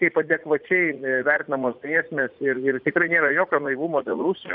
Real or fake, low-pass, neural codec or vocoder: fake; 5.4 kHz; vocoder, 44.1 kHz, 80 mel bands, Vocos